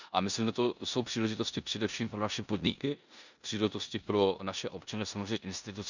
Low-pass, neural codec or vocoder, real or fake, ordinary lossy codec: 7.2 kHz; codec, 16 kHz in and 24 kHz out, 0.9 kbps, LongCat-Audio-Codec, four codebook decoder; fake; none